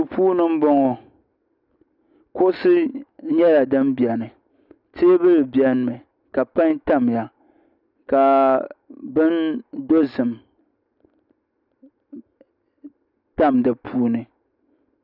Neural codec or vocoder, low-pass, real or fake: none; 5.4 kHz; real